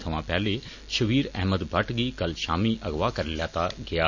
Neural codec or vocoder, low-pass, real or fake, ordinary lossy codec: none; 7.2 kHz; real; none